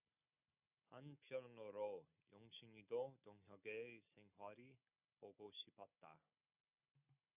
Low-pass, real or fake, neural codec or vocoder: 3.6 kHz; real; none